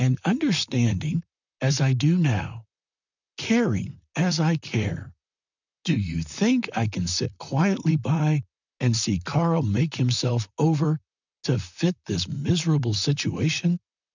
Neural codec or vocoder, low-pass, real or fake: vocoder, 44.1 kHz, 128 mel bands, Pupu-Vocoder; 7.2 kHz; fake